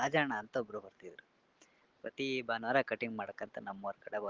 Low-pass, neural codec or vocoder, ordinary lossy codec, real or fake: 7.2 kHz; none; Opus, 16 kbps; real